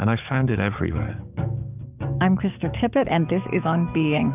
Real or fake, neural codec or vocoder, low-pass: fake; codec, 44.1 kHz, 7.8 kbps, DAC; 3.6 kHz